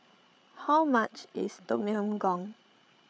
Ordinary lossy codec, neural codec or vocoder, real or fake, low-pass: none; codec, 16 kHz, 8 kbps, FreqCodec, larger model; fake; none